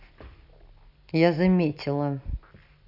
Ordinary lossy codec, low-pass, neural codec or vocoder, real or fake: none; 5.4 kHz; none; real